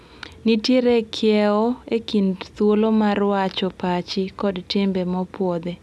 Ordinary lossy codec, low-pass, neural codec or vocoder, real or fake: none; none; none; real